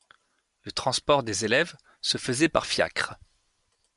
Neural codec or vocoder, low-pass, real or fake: none; 10.8 kHz; real